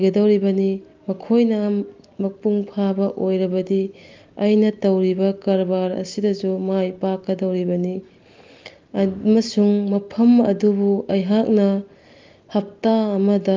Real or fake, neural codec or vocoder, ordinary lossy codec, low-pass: real; none; Opus, 32 kbps; 7.2 kHz